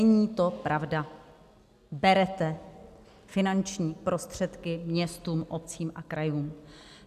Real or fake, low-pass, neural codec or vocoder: real; 14.4 kHz; none